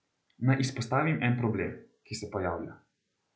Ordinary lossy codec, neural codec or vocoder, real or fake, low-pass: none; none; real; none